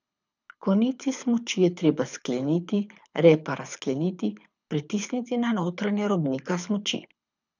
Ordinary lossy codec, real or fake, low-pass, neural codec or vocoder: none; fake; 7.2 kHz; codec, 24 kHz, 6 kbps, HILCodec